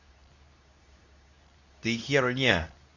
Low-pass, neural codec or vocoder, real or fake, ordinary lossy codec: 7.2 kHz; codec, 24 kHz, 0.9 kbps, WavTokenizer, medium speech release version 2; fake; none